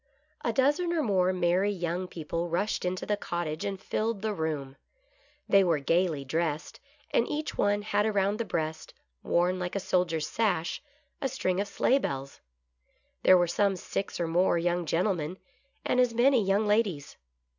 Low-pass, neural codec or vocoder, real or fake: 7.2 kHz; none; real